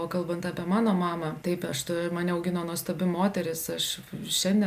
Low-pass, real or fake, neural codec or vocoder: 14.4 kHz; real; none